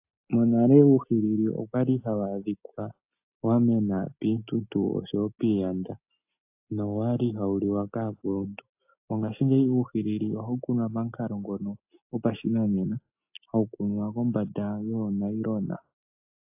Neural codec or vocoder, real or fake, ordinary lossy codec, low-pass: none; real; AAC, 32 kbps; 3.6 kHz